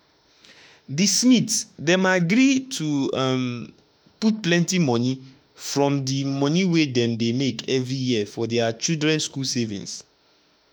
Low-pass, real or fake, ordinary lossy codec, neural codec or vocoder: none; fake; none; autoencoder, 48 kHz, 32 numbers a frame, DAC-VAE, trained on Japanese speech